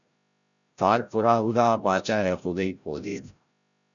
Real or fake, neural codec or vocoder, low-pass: fake; codec, 16 kHz, 0.5 kbps, FreqCodec, larger model; 7.2 kHz